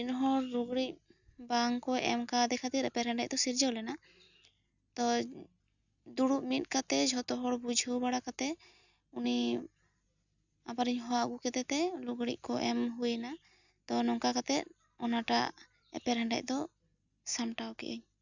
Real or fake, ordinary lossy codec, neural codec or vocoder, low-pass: real; none; none; 7.2 kHz